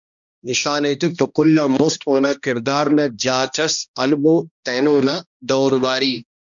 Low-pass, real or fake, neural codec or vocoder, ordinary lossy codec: 7.2 kHz; fake; codec, 16 kHz, 1 kbps, X-Codec, HuBERT features, trained on balanced general audio; MP3, 96 kbps